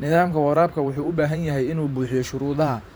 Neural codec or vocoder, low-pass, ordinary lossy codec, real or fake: vocoder, 44.1 kHz, 128 mel bands every 512 samples, BigVGAN v2; none; none; fake